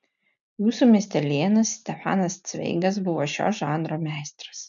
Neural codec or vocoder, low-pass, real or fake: none; 7.2 kHz; real